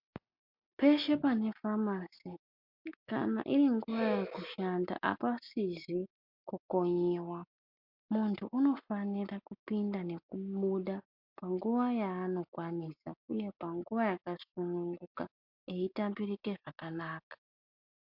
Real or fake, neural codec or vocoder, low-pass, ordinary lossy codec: real; none; 5.4 kHz; MP3, 48 kbps